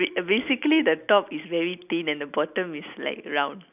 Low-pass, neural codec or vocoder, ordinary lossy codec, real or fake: 3.6 kHz; none; none; real